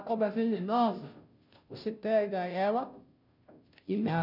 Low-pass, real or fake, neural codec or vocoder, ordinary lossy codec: 5.4 kHz; fake; codec, 16 kHz, 0.5 kbps, FunCodec, trained on Chinese and English, 25 frames a second; none